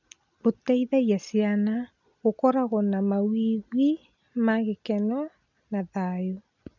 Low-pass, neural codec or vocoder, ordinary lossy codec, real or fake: 7.2 kHz; none; none; real